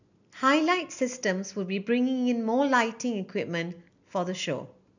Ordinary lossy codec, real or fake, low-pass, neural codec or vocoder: none; real; 7.2 kHz; none